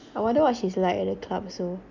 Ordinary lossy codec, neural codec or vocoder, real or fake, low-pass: none; none; real; 7.2 kHz